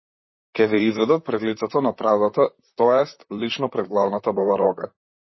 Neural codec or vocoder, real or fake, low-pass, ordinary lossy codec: vocoder, 44.1 kHz, 128 mel bands, Pupu-Vocoder; fake; 7.2 kHz; MP3, 24 kbps